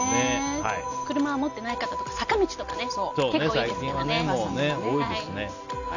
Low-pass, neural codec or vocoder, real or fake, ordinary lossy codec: 7.2 kHz; none; real; none